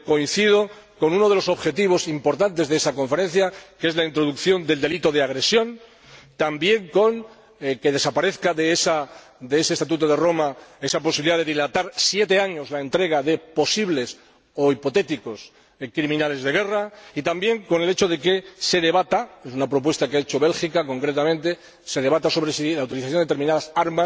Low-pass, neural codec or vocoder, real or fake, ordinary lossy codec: none; none; real; none